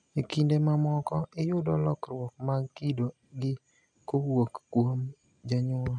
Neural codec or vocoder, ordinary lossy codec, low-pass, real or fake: none; none; 9.9 kHz; real